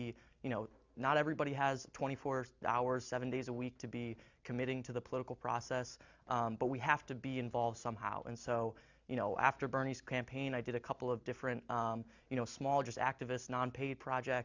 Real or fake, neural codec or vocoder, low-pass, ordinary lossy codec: real; none; 7.2 kHz; Opus, 64 kbps